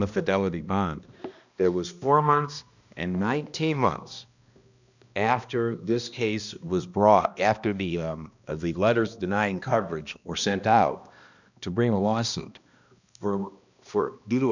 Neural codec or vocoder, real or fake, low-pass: codec, 16 kHz, 1 kbps, X-Codec, HuBERT features, trained on balanced general audio; fake; 7.2 kHz